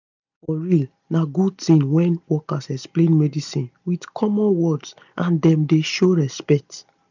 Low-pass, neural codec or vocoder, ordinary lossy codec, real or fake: 7.2 kHz; none; none; real